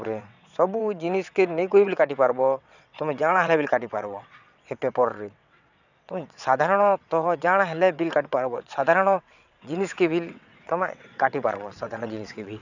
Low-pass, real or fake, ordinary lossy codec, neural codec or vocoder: 7.2 kHz; fake; none; vocoder, 22.05 kHz, 80 mel bands, WaveNeXt